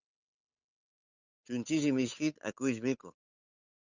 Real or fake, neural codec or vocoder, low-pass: fake; codec, 16 kHz, 8 kbps, FunCodec, trained on Chinese and English, 25 frames a second; 7.2 kHz